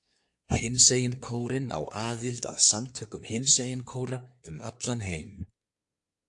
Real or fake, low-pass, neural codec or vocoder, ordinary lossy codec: fake; 10.8 kHz; codec, 24 kHz, 1 kbps, SNAC; AAC, 64 kbps